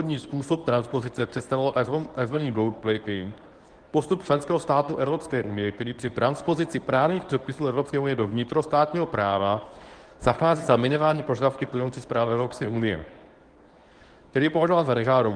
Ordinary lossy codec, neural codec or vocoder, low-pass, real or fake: Opus, 24 kbps; codec, 24 kHz, 0.9 kbps, WavTokenizer, medium speech release version 1; 9.9 kHz; fake